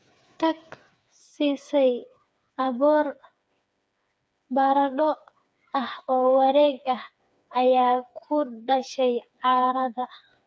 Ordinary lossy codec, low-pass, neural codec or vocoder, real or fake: none; none; codec, 16 kHz, 4 kbps, FreqCodec, smaller model; fake